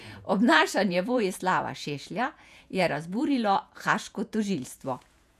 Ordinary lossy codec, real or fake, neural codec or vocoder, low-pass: none; fake; vocoder, 48 kHz, 128 mel bands, Vocos; 14.4 kHz